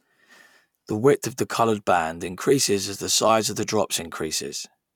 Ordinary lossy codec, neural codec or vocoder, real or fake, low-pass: none; vocoder, 44.1 kHz, 128 mel bands every 256 samples, BigVGAN v2; fake; 19.8 kHz